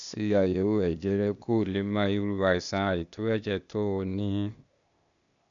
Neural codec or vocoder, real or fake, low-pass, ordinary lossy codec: codec, 16 kHz, 0.8 kbps, ZipCodec; fake; 7.2 kHz; none